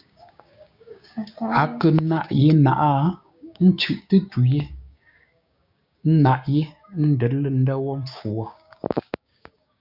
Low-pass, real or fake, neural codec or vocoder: 5.4 kHz; fake; codec, 16 kHz, 6 kbps, DAC